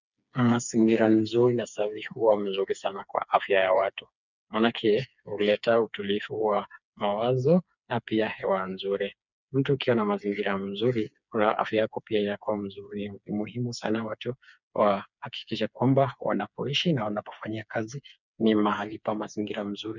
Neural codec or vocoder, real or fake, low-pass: codec, 16 kHz, 4 kbps, FreqCodec, smaller model; fake; 7.2 kHz